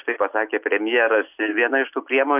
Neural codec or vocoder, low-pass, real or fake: none; 3.6 kHz; real